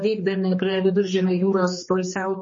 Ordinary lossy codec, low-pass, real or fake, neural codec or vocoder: MP3, 32 kbps; 7.2 kHz; fake; codec, 16 kHz, 2 kbps, X-Codec, HuBERT features, trained on general audio